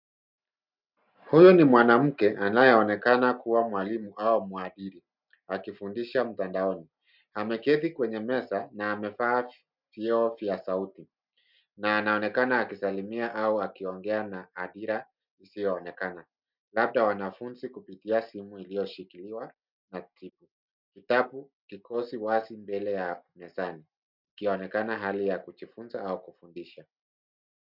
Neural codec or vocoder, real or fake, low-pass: none; real; 5.4 kHz